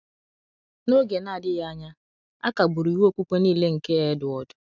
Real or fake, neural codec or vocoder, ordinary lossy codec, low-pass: real; none; none; 7.2 kHz